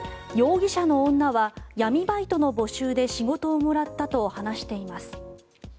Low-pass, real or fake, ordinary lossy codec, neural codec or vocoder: none; real; none; none